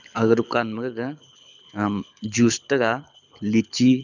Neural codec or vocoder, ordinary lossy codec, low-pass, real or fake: codec, 24 kHz, 6 kbps, HILCodec; none; 7.2 kHz; fake